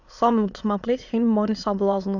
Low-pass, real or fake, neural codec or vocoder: 7.2 kHz; fake; autoencoder, 22.05 kHz, a latent of 192 numbers a frame, VITS, trained on many speakers